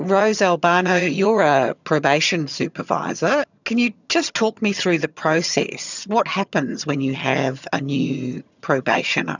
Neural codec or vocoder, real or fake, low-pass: vocoder, 22.05 kHz, 80 mel bands, HiFi-GAN; fake; 7.2 kHz